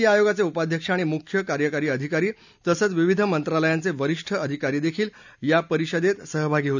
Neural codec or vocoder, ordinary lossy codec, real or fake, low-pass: none; none; real; 7.2 kHz